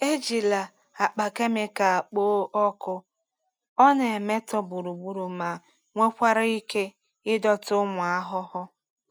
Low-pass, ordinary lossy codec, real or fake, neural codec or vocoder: none; none; real; none